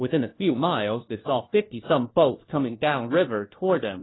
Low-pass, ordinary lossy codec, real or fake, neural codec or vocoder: 7.2 kHz; AAC, 16 kbps; fake; codec, 16 kHz, 1 kbps, FunCodec, trained on LibriTTS, 50 frames a second